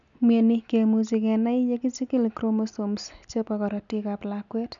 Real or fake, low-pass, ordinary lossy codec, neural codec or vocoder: real; 7.2 kHz; none; none